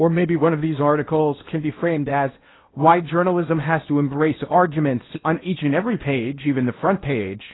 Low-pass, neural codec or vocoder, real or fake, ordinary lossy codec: 7.2 kHz; codec, 16 kHz in and 24 kHz out, 0.8 kbps, FocalCodec, streaming, 65536 codes; fake; AAC, 16 kbps